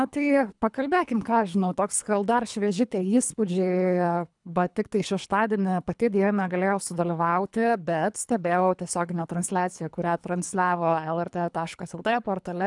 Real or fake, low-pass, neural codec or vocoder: fake; 10.8 kHz; codec, 24 kHz, 3 kbps, HILCodec